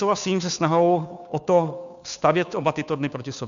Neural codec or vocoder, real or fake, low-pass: codec, 16 kHz, 2 kbps, FunCodec, trained on Chinese and English, 25 frames a second; fake; 7.2 kHz